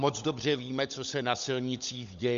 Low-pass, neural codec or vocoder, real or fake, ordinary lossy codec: 7.2 kHz; codec, 16 kHz, 16 kbps, FunCodec, trained on LibriTTS, 50 frames a second; fake; MP3, 64 kbps